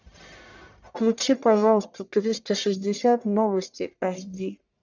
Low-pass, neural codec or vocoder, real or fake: 7.2 kHz; codec, 44.1 kHz, 1.7 kbps, Pupu-Codec; fake